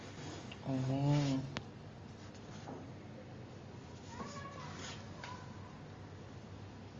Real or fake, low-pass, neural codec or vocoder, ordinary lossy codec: real; 7.2 kHz; none; Opus, 32 kbps